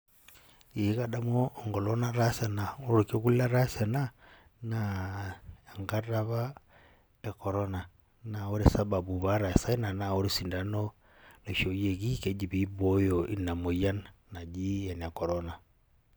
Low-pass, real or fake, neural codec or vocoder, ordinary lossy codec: none; real; none; none